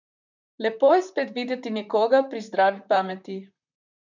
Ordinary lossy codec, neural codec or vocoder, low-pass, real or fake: none; vocoder, 44.1 kHz, 128 mel bands, Pupu-Vocoder; 7.2 kHz; fake